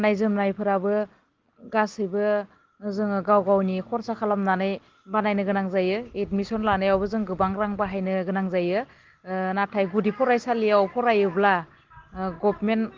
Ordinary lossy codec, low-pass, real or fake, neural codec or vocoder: Opus, 16 kbps; 7.2 kHz; real; none